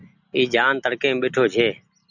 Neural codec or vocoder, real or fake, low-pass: none; real; 7.2 kHz